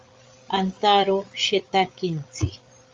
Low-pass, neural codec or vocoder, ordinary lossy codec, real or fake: 7.2 kHz; codec, 16 kHz, 8 kbps, FreqCodec, larger model; Opus, 32 kbps; fake